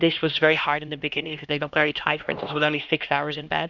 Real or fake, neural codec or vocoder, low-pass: fake; codec, 16 kHz, 1 kbps, X-Codec, HuBERT features, trained on LibriSpeech; 7.2 kHz